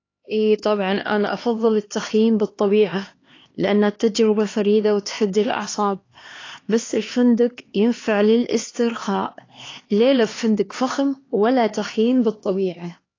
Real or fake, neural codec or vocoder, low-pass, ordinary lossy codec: fake; codec, 16 kHz, 2 kbps, X-Codec, HuBERT features, trained on LibriSpeech; 7.2 kHz; AAC, 32 kbps